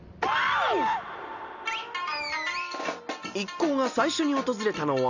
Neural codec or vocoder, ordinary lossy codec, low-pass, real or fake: none; none; 7.2 kHz; real